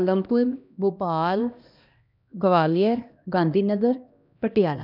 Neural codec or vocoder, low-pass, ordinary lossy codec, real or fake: codec, 16 kHz, 1 kbps, X-Codec, HuBERT features, trained on LibriSpeech; 5.4 kHz; none; fake